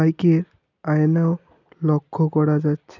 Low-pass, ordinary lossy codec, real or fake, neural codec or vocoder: 7.2 kHz; none; real; none